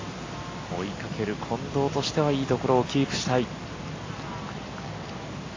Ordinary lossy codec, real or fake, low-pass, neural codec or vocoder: AAC, 32 kbps; real; 7.2 kHz; none